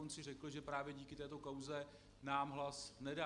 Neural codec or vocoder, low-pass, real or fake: none; 10.8 kHz; real